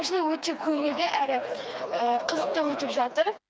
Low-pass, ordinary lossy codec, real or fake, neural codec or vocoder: none; none; fake; codec, 16 kHz, 2 kbps, FreqCodec, smaller model